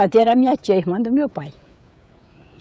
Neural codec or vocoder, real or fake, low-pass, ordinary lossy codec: codec, 16 kHz, 16 kbps, FreqCodec, smaller model; fake; none; none